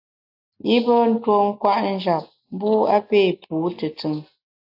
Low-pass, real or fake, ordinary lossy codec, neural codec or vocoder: 5.4 kHz; real; AAC, 48 kbps; none